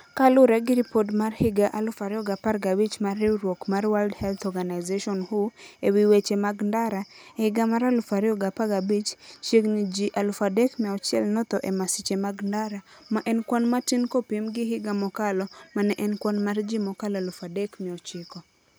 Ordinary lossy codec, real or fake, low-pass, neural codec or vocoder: none; real; none; none